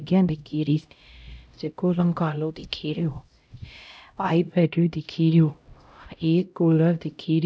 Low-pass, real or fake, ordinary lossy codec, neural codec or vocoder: none; fake; none; codec, 16 kHz, 0.5 kbps, X-Codec, HuBERT features, trained on LibriSpeech